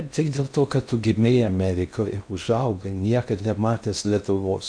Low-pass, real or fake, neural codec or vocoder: 9.9 kHz; fake; codec, 16 kHz in and 24 kHz out, 0.6 kbps, FocalCodec, streaming, 4096 codes